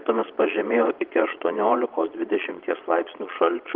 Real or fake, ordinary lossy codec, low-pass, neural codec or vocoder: fake; Opus, 32 kbps; 5.4 kHz; vocoder, 22.05 kHz, 80 mel bands, Vocos